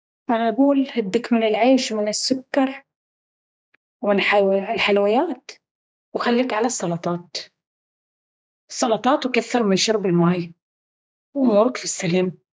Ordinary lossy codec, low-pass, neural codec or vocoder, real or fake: none; none; codec, 16 kHz, 2 kbps, X-Codec, HuBERT features, trained on general audio; fake